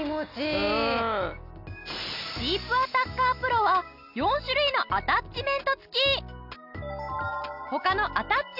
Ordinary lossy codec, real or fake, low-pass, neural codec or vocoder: none; real; 5.4 kHz; none